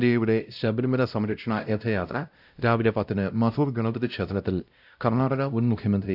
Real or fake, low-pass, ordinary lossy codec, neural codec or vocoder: fake; 5.4 kHz; none; codec, 16 kHz, 0.5 kbps, X-Codec, WavLM features, trained on Multilingual LibriSpeech